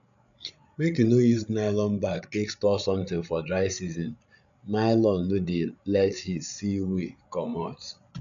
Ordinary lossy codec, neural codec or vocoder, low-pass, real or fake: none; codec, 16 kHz, 8 kbps, FreqCodec, larger model; 7.2 kHz; fake